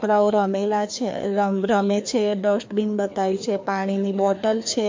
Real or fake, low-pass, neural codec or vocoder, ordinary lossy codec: fake; 7.2 kHz; codec, 16 kHz, 2 kbps, FreqCodec, larger model; MP3, 48 kbps